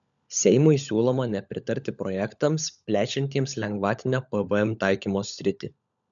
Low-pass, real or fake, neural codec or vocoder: 7.2 kHz; fake; codec, 16 kHz, 16 kbps, FunCodec, trained on LibriTTS, 50 frames a second